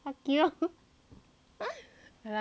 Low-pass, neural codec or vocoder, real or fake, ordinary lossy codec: none; none; real; none